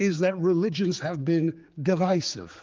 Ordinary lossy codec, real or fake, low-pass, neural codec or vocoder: Opus, 32 kbps; fake; 7.2 kHz; codec, 16 kHz, 4 kbps, X-Codec, HuBERT features, trained on general audio